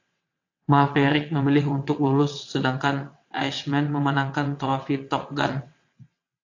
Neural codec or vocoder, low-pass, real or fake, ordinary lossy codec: vocoder, 22.05 kHz, 80 mel bands, WaveNeXt; 7.2 kHz; fake; AAC, 48 kbps